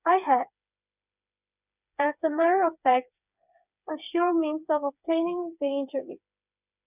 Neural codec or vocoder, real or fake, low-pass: codec, 16 kHz, 4 kbps, FreqCodec, smaller model; fake; 3.6 kHz